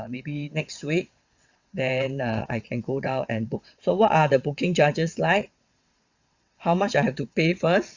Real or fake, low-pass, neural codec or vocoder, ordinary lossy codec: fake; 7.2 kHz; vocoder, 22.05 kHz, 80 mel bands, WaveNeXt; Opus, 64 kbps